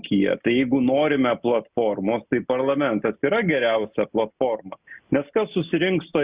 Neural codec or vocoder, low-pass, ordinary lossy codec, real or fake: none; 3.6 kHz; Opus, 64 kbps; real